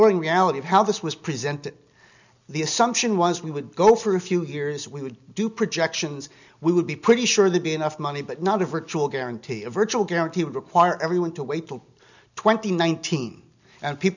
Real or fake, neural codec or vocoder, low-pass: real; none; 7.2 kHz